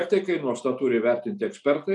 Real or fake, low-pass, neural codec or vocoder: real; 10.8 kHz; none